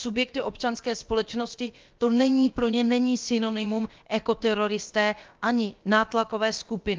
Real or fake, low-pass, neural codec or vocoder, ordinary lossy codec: fake; 7.2 kHz; codec, 16 kHz, about 1 kbps, DyCAST, with the encoder's durations; Opus, 24 kbps